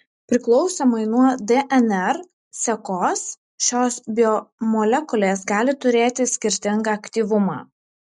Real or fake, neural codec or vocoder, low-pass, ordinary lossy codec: real; none; 10.8 kHz; MP3, 64 kbps